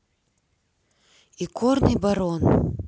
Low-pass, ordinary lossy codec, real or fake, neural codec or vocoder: none; none; real; none